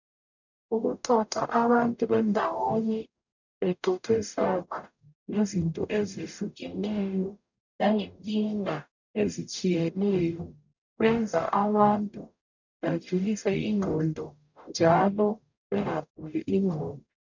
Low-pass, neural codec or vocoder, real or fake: 7.2 kHz; codec, 44.1 kHz, 0.9 kbps, DAC; fake